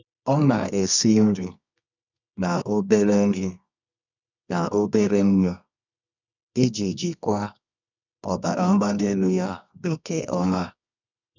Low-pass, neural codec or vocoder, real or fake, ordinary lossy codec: 7.2 kHz; codec, 24 kHz, 0.9 kbps, WavTokenizer, medium music audio release; fake; none